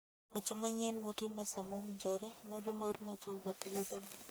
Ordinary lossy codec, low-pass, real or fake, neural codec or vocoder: none; none; fake; codec, 44.1 kHz, 1.7 kbps, Pupu-Codec